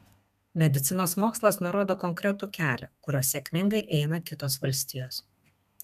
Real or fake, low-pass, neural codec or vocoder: fake; 14.4 kHz; codec, 32 kHz, 1.9 kbps, SNAC